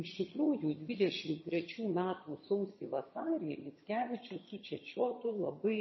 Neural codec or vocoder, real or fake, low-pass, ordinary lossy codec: vocoder, 22.05 kHz, 80 mel bands, HiFi-GAN; fake; 7.2 kHz; MP3, 24 kbps